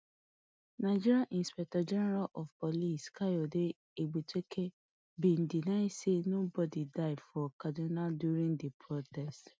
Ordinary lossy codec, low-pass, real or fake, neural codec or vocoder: none; none; real; none